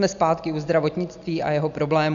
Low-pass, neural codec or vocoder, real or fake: 7.2 kHz; none; real